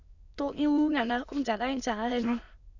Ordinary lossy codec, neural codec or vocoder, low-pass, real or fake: none; autoencoder, 22.05 kHz, a latent of 192 numbers a frame, VITS, trained on many speakers; 7.2 kHz; fake